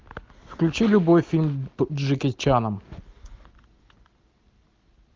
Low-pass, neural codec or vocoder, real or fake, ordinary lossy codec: 7.2 kHz; none; real; Opus, 24 kbps